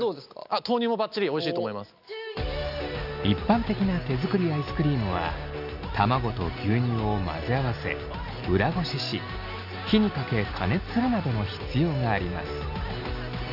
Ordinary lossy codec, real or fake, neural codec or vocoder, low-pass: none; real; none; 5.4 kHz